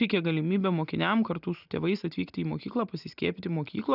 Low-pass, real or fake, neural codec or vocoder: 5.4 kHz; real; none